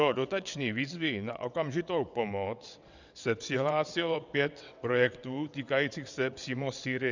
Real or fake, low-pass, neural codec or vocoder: fake; 7.2 kHz; vocoder, 22.05 kHz, 80 mel bands, WaveNeXt